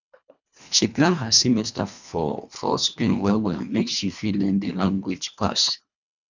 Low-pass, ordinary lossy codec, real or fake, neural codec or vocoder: 7.2 kHz; none; fake; codec, 24 kHz, 1.5 kbps, HILCodec